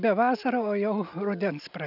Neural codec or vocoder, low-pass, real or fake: none; 5.4 kHz; real